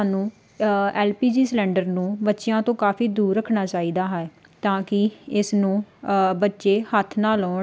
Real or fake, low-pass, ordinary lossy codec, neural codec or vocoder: real; none; none; none